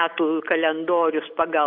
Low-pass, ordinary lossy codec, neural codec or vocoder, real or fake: 5.4 kHz; AAC, 48 kbps; none; real